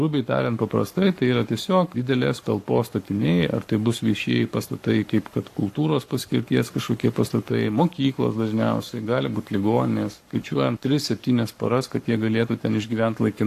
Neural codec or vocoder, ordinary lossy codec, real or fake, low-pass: codec, 44.1 kHz, 7.8 kbps, Pupu-Codec; AAC, 64 kbps; fake; 14.4 kHz